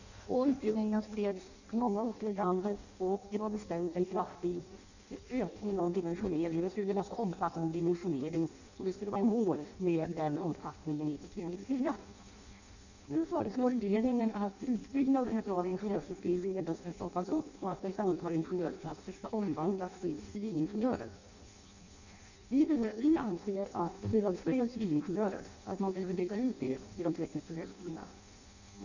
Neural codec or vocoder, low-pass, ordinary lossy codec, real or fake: codec, 16 kHz in and 24 kHz out, 0.6 kbps, FireRedTTS-2 codec; 7.2 kHz; none; fake